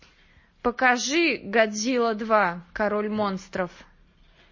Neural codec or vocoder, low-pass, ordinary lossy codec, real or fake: none; 7.2 kHz; MP3, 32 kbps; real